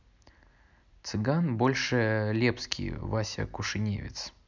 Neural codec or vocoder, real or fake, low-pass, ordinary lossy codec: none; real; 7.2 kHz; none